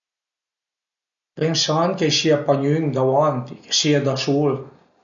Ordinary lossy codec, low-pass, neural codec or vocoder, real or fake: none; 7.2 kHz; none; real